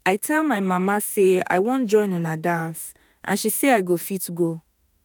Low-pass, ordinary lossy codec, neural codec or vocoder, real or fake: none; none; autoencoder, 48 kHz, 32 numbers a frame, DAC-VAE, trained on Japanese speech; fake